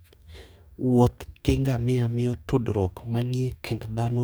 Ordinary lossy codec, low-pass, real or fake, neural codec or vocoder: none; none; fake; codec, 44.1 kHz, 2.6 kbps, DAC